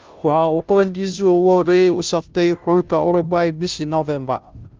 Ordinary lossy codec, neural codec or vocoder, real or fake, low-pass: Opus, 24 kbps; codec, 16 kHz, 0.5 kbps, FunCodec, trained on Chinese and English, 25 frames a second; fake; 7.2 kHz